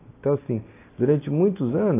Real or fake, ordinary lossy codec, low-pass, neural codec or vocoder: real; AAC, 24 kbps; 3.6 kHz; none